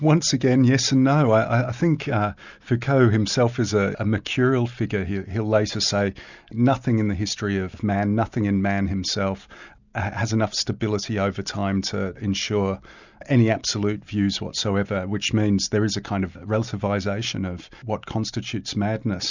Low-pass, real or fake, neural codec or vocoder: 7.2 kHz; real; none